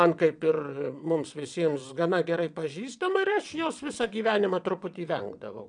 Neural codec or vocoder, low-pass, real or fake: vocoder, 22.05 kHz, 80 mel bands, WaveNeXt; 9.9 kHz; fake